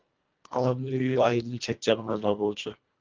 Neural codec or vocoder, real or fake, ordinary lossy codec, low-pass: codec, 24 kHz, 1.5 kbps, HILCodec; fake; Opus, 32 kbps; 7.2 kHz